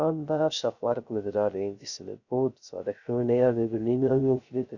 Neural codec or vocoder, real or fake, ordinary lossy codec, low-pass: codec, 16 kHz, 0.3 kbps, FocalCodec; fake; none; 7.2 kHz